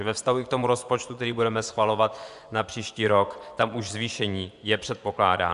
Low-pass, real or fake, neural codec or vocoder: 10.8 kHz; fake; vocoder, 24 kHz, 100 mel bands, Vocos